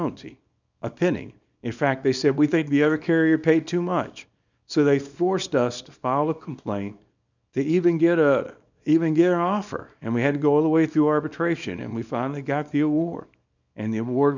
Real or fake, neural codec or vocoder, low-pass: fake; codec, 24 kHz, 0.9 kbps, WavTokenizer, small release; 7.2 kHz